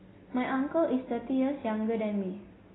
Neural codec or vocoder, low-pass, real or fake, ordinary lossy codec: none; 7.2 kHz; real; AAC, 16 kbps